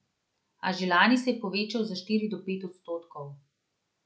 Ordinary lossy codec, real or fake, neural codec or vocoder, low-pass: none; real; none; none